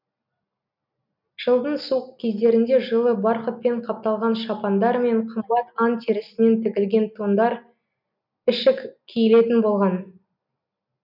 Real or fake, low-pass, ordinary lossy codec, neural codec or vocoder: real; 5.4 kHz; none; none